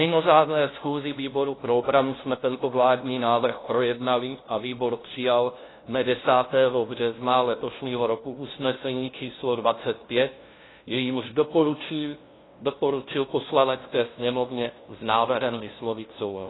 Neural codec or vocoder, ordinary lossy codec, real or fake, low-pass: codec, 16 kHz, 0.5 kbps, FunCodec, trained on LibriTTS, 25 frames a second; AAC, 16 kbps; fake; 7.2 kHz